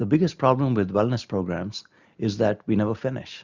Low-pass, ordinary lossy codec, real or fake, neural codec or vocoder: 7.2 kHz; Opus, 64 kbps; real; none